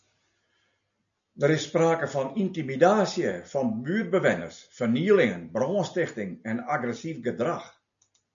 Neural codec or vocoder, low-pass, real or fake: none; 7.2 kHz; real